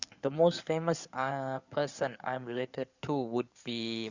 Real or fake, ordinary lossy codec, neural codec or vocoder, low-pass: fake; Opus, 64 kbps; codec, 16 kHz in and 24 kHz out, 2.2 kbps, FireRedTTS-2 codec; 7.2 kHz